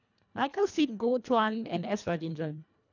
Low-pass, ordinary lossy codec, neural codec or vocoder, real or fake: 7.2 kHz; none; codec, 24 kHz, 1.5 kbps, HILCodec; fake